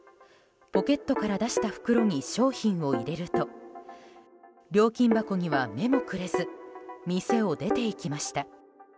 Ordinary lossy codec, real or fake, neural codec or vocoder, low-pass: none; real; none; none